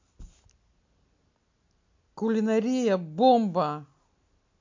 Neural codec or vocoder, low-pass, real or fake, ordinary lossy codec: none; 7.2 kHz; real; MP3, 48 kbps